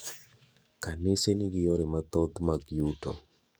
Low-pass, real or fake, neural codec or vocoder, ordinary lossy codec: none; fake; codec, 44.1 kHz, 7.8 kbps, DAC; none